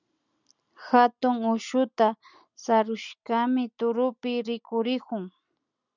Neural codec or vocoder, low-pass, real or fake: none; 7.2 kHz; real